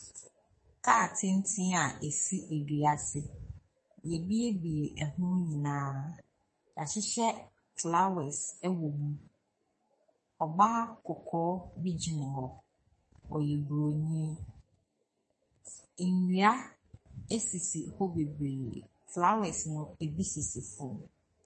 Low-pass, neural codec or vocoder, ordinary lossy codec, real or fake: 10.8 kHz; codec, 44.1 kHz, 2.6 kbps, SNAC; MP3, 32 kbps; fake